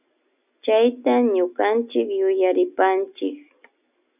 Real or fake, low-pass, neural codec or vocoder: real; 3.6 kHz; none